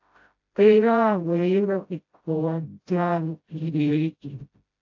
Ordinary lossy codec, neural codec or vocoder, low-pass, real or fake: AAC, 48 kbps; codec, 16 kHz, 0.5 kbps, FreqCodec, smaller model; 7.2 kHz; fake